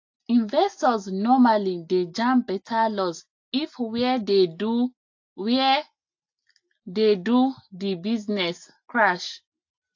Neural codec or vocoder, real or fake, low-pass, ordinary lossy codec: none; real; 7.2 kHz; AAC, 48 kbps